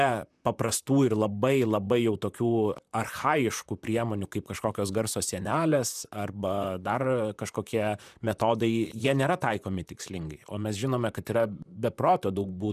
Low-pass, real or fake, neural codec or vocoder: 14.4 kHz; fake; vocoder, 44.1 kHz, 128 mel bands, Pupu-Vocoder